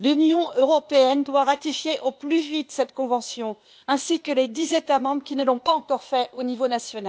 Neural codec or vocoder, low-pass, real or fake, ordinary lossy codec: codec, 16 kHz, 0.8 kbps, ZipCodec; none; fake; none